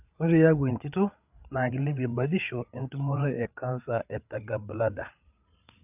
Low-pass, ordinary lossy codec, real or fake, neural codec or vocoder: 3.6 kHz; none; fake; codec, 16 kHz, 8 kbps, FreqCodec, larger model